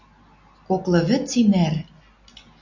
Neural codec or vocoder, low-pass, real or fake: none; 7.2 kHz; real